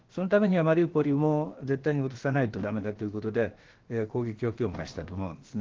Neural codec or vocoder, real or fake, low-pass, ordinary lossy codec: codec, 16 kHz, about 1 kbps, DyCAST, with the encoder's durations; fake; 7.2 kHz; Opus, 16 kbps